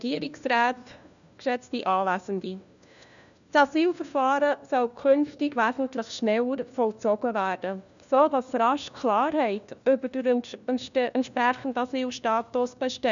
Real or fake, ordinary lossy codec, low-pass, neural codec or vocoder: fake; none; 7.2 kHz; codec, 16 kHz, 1 kbps, FunCodec, trained on LibriTTS, 50 frames a second